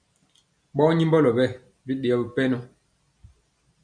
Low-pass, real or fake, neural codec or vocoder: 9.9 kHz; real; none